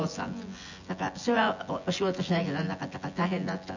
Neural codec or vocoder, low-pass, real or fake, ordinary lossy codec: vocoder, 24 kHz, 100 mel bands, Vocos; 7.2 kHz; fake; none